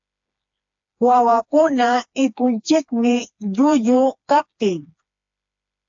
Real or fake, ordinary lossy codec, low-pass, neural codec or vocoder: fake; AAC, 64 kbps; 7.2 kHz; codec, 16 kHz, 2 kbps, FreqCodec, smaller model